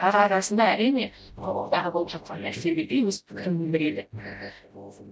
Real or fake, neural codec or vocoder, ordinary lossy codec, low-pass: fake; codec, 16 kHz, 0.5 kbps, FreqCodec, smaller model; none; none